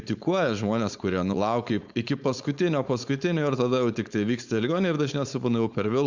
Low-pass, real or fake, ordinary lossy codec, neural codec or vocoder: 7.2 kHz; fake; Opus, 64 kbps; codec, 16 kHz, 4.8 kbps, FACodec